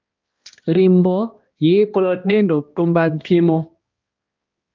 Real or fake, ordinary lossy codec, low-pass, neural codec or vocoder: fake; Opus, 24 kbps; 7.2 kHz; codec, 16 kHz, 1 kbps, X-Codec, HuBERT features, trained on balanced general audio